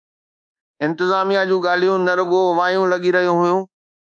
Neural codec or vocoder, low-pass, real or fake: codec, 24 kHz, 1.2 kbps, DualCodec; 9.9 kHz; fake